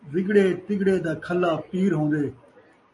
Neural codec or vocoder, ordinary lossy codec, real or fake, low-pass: none; MP3, 48 kbps; real; 10.8 kHz